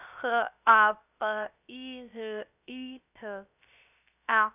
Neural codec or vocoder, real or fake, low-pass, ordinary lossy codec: codec, 16 kHz, 0.3 kbps, FocalCodec; fake; 3.6 kHz; none